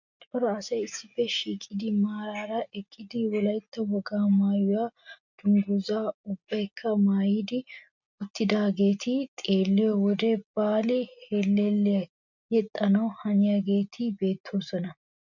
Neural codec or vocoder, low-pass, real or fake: none; 7.2 kHz; real